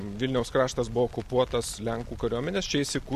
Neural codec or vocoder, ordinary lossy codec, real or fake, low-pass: none; AAC, 96 kbps; real; 14.4 kHz